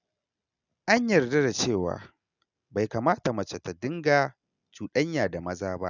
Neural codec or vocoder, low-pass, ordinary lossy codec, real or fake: none; 7.2 kHz; none; real